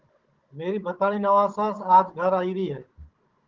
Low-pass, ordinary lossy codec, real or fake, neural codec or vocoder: 7.2 kHz; Opus, 16 kbps; fake; codec, 16 kHz, 16 kbps, FunCodec, trained on Chinese and English, 50 frames a second